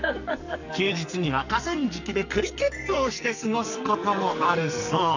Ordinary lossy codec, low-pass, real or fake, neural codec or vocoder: none; 7.2 kHz; fake; codec, 44.1 kHz, 2.6 kbps, SNAC